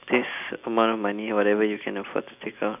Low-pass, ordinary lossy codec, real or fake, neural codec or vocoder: 3.6 kHz; none; real; none